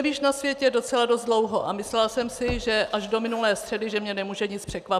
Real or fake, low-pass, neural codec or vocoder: real; 14.4 kHz; none